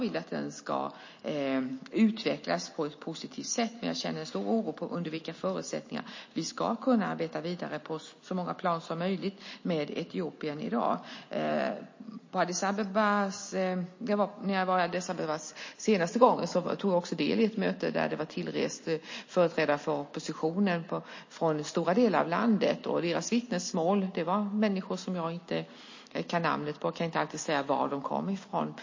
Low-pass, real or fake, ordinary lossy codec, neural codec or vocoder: 7.2 kHz; real; MP3, 32 kbps; none